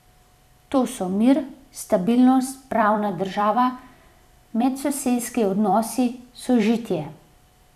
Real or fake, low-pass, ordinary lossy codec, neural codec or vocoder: fake; 14.4 kHz; none; vocoder, 44.1 kHz, 128 mel bands every 256 samples, BigVGAN v2